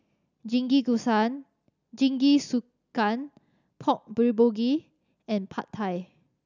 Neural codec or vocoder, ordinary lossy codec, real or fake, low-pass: none; none; real; 7.2 kHz